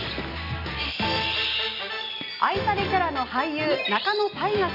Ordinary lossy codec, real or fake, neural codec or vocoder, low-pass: none; real; none; 5.4 kHz